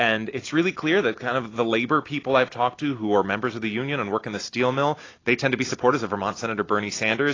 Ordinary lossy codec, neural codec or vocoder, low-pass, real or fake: AAC, 32 kbps; none; 7.2 kHz; real